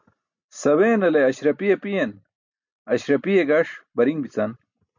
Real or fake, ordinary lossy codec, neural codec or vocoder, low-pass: real; MP3, 64 kbps; none; 7.2 kHz